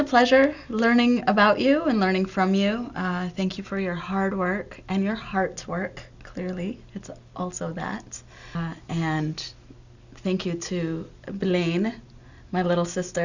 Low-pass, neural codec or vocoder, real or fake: 7.2 kHz; none; real